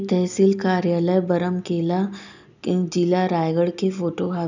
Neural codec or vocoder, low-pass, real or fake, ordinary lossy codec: none; 7.2 kHz; real; none